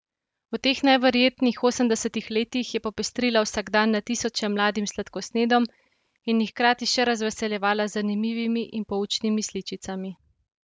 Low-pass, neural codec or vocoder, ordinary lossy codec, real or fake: none; none; none; real